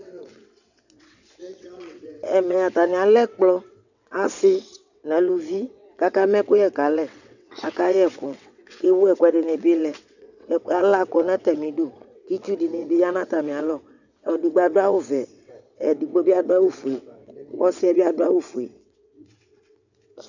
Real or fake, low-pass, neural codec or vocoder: fake; 7.2 kHz; vocoder, 22.05 kHz, 80 mel bands, WaveNeXt